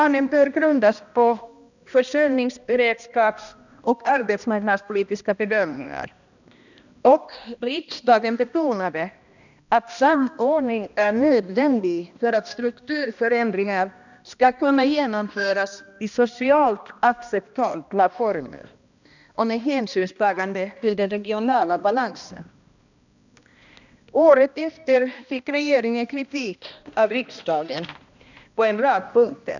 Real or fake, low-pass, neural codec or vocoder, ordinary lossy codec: fake; 7.2 kHz; codec, 16 kHz, 1 kbps, X-Codec, HuBERT features, trained on balanced general audio; none